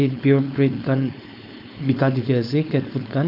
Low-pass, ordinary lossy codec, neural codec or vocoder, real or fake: 5.4 kHz; AAC, 48 kbps; codec, 16 kHz, 4.8 kbps, FACodec; fake